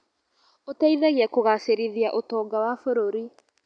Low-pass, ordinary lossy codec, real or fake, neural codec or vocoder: 9.9 kHz; none; real; none